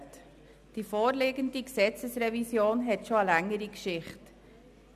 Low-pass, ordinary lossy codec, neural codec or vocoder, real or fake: 14.4 kHz; none; none; real